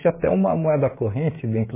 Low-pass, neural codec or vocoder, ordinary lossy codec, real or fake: 3.6 kHz; codec, 16 kHz, 16 kbps, FreqCodec, smaller model; MP3, 16 kbps; fake